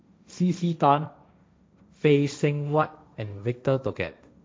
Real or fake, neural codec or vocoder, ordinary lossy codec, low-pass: fake; codec, 16 kHz, 1.1 kbps, Voila-Tokenizer; none; none